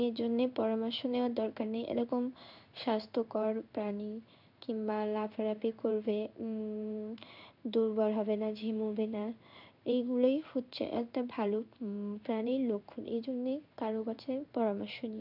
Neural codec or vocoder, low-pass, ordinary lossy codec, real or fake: codec, 16 kHz in and 24 kHz out, 1 kbps, XY-Tokenizer; 5.4 kHz; none; fake